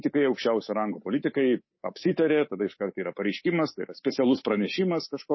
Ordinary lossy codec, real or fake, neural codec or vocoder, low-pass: MP3, 24 kbps; fake; codec, 24 kHz, 3.1 kbps, DualCodec; 7.2 kHz